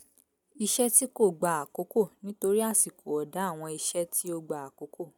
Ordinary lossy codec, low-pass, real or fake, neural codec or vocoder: none; none; real; none